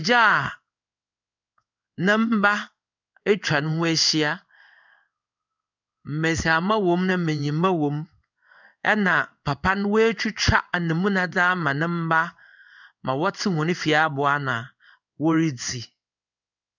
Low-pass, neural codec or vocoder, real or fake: 7.2 kHz; codec, 16 kHz in and 24 kHz out, 1 kbps, XY-Tokenizer; fake